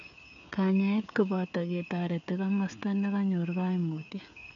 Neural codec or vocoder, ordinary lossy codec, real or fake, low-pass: codec, 16 kHz, 16 kbps, FreqCodec, smaller model; none; fake; 7.2 kHz